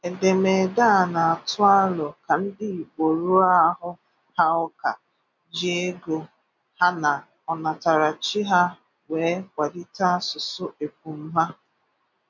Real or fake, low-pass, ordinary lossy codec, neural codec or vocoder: real; 7.2 kHz; none; none